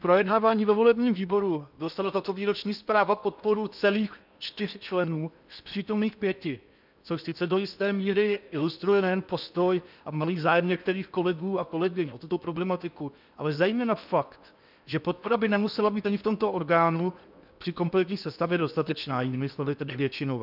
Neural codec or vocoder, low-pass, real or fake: codec, 16 kHz in and 24 kHz out, 0.8 kbps, FocalCodec, streaming, 65536 codes; 5.4 kHz; fake